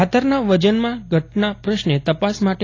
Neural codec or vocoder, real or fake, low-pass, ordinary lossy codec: none; real; 7.2 kHz; AAC, 32 kbps